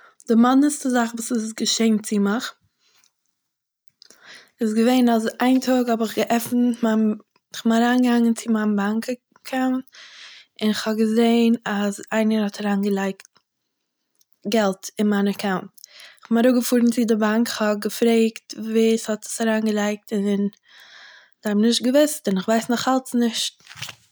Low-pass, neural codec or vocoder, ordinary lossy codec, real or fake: none; none; none; real